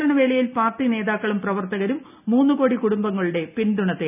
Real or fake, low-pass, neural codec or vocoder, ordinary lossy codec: real; 3.6 kHz; none; none